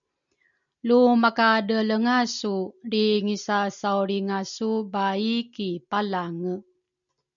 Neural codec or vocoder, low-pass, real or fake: none; 7.2 kHz; real